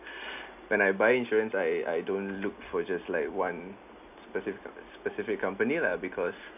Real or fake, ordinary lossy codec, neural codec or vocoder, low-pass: real; none; none; 3.6 kHz